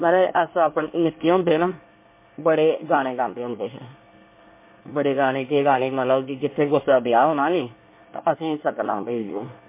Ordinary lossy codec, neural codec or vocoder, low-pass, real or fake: MP3, 24 kbps; codec, 24 kHz, 1 kbps, SNAC; 3.6 kHz; fake